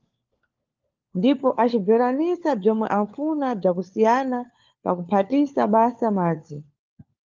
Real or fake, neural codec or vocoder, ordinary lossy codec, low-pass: fake; codec, 16 kHz, 4 kbps, FunCodec, trained on LibriTTS, 50 frames a second; Opus, 32 kbps; 7.2 kHz